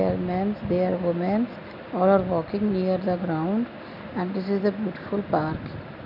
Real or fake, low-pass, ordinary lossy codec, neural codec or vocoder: real; 5.4 kHz; none; none